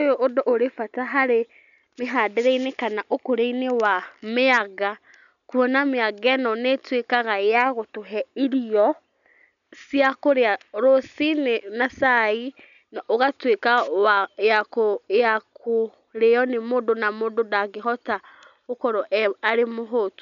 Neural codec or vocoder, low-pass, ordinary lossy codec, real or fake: none; 7.2 kHz; none; real